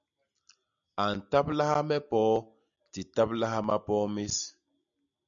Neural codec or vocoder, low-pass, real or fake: none; 7.2 kHz; real